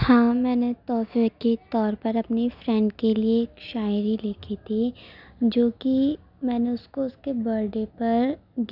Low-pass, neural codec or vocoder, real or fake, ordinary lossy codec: 5.4 kHz; none; real; none